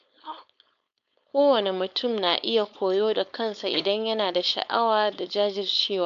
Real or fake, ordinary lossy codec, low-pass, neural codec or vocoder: fake; none; 7.2 kHz; codec, 16 kHz, 4.8 kbps, FACodec